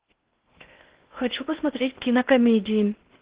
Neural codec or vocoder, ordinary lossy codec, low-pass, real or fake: codec, 16 kHz in and 24 kHz out, 0.6 kbps, FocalCodec, streaming, 4096 codes; Opus, 16 kbps; 3.6 kHz; fake